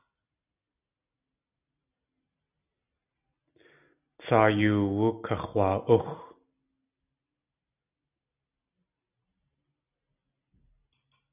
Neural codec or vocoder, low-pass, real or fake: none; 3.6 kHz; real